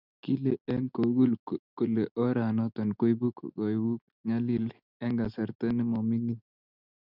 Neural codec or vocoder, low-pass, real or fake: none; 5.4 kHz; real